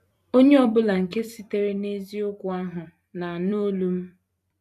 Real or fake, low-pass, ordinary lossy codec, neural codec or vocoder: fake; 14.4 kHz; none; vocoder, 44.1 kHz, 128 mel bands every 256 samples, BigVGAN v2